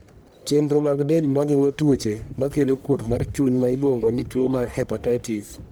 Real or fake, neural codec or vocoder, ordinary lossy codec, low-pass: fake; codec, 44.1 kHz, 1.7 kbps, Pupu-Codec; none; none